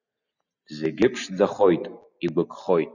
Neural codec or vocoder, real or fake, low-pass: none; real; 7.2 kHz